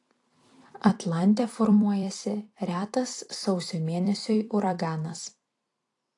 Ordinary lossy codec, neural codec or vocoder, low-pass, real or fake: AAC, 48 kbps; vocoder, 44.1 kHz, 128 mel bands every 256 samples, BigVGAN v2; 10.8 kHz; fake